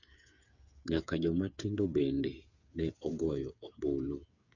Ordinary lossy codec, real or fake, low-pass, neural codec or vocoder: none; fake; 7.2 kHz; codec, 16 kHz, 8 kbps, FreqCodec, smaller model